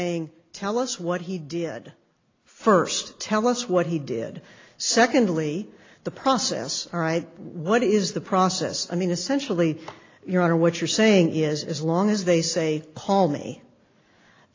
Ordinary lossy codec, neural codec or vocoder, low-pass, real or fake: AAC, 32 kbps; none; 7.2 kHz; real